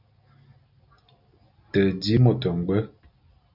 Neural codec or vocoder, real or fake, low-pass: none; real; 5.4 kHz